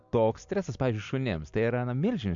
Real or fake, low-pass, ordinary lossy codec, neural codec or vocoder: real; 7.2 kHz; MP3, 64 kbps; none